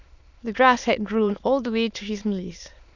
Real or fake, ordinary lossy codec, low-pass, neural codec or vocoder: fake; none; 7.2 kHz; autoencoder, 22.05 kHz, a latent of 192 numbers a frame, VITS, trained on many speakers